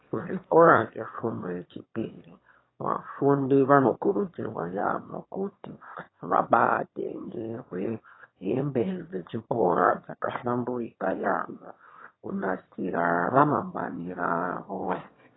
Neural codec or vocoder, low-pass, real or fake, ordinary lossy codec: autoencoder, 22.05 kHz, a latent of 192 numbers a frame, VITS, trained on one speaker; 7.2 kHz; fake; AAC, 16 kbps